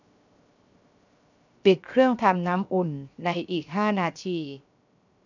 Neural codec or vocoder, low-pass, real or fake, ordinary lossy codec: codec, 16 kHz, 0.7 kbps, FocalCodec; 7.2 kHz; fake; none